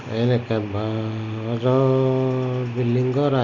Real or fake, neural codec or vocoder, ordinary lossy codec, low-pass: real; none; Opus, 64 kbps; 7.2 kHz